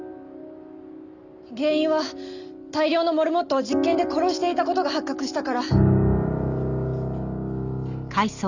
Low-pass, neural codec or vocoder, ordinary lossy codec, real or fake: 7.2 kHz; none; none; real